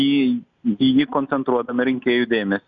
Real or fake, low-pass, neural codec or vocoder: real; 7.2 kHz; none